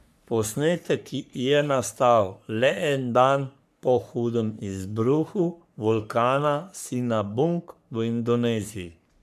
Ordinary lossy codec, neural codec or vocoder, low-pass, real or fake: none; codec, 44.1 kHz, 3.4 kbps, Pupu-Codec; 14.4 kHz; fake